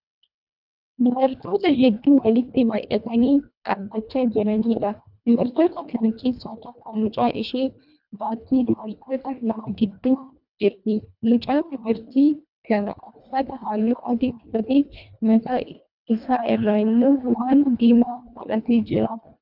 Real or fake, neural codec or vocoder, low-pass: fake; codec, 24 kHz, 1.5 kbps, HILCodec; 5.4 kHz